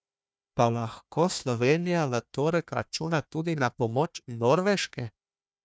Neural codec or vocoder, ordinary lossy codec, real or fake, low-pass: codec, 16 kHz, 1 kbps, FunCodec, trained on Chinese and English, 50 frames a second; none; fake; none